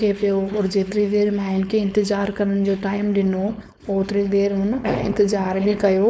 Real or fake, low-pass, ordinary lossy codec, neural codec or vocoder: fake; none; none; codec, 16 kHz, 4.8 kbps, FACodec